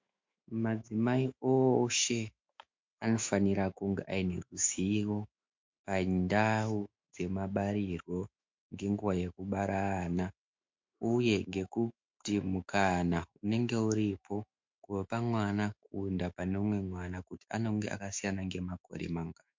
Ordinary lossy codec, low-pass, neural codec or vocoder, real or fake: MP3, 48 kbps; 7.2 kHz; autoencoder, 48 kHz, 128 numbers a frame, DAC-VAE, trained on Japanese speech; fake